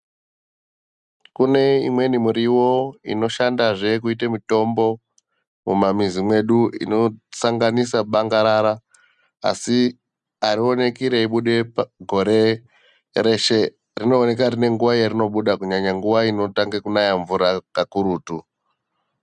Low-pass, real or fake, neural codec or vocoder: 10.8 kHz; real; none